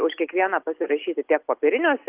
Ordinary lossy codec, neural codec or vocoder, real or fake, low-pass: Opus, 32 kbps; none; real; 3.6 kHz